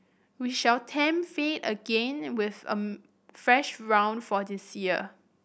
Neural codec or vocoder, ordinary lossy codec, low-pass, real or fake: none; none; none; real